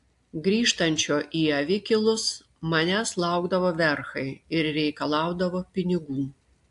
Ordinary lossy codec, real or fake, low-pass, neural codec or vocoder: AAC, 64 kbps; real; 10.8 kHz; none